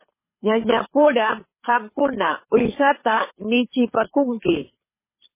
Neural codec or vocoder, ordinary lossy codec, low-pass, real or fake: codec, 24 kHz, 6 kbps, HILCodec; MP3, 16 kbps; 3.6 kHz; fake